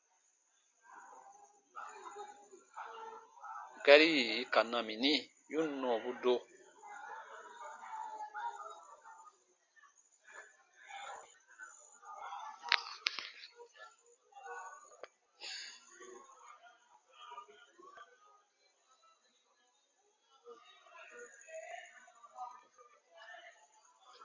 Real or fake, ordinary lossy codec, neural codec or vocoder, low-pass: real; MP3, 48 kbps; none; 7.2 kHz